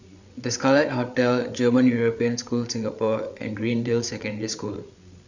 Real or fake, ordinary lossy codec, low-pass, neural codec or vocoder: fake; none; 7.2 kHz; codec, 16 kHz, 8 kbps, FreqCodec, larger model